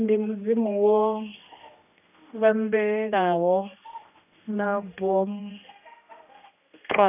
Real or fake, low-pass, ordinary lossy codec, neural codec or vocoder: fake; 3.6 kHz; none; codec, 16 kHz, 1 kbps, X-Codec, HuBERT features, trained on general audio